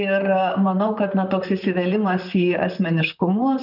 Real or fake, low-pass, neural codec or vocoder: fake; 5.4 kHz; vocoder, 44.1 kHz, 128 mel bands, Pupu-Vocoder